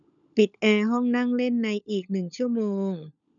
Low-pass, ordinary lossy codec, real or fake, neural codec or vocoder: 7.2 kHz; none; fake; codec, 16 kHz, 16 kbps, FunCodec, trained on LibriTTS, 50 frames a second